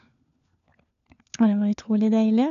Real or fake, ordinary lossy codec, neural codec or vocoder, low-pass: fake; none; codec, 16 kHz, 4 kbps, FunCodec, trained on LibriTTS, 50 frames a second; 7.2 kHz